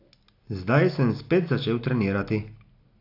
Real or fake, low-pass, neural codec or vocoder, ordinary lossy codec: real; 5.4 kHz; none; AAC, 32 kbps